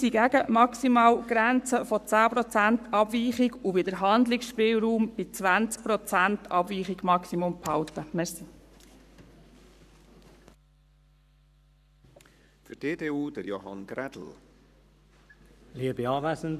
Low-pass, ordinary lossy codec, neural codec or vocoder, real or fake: 14.4 kHz; none; codec, 44.1 kHz, 7.8 kbps, Pupu-Codec; fake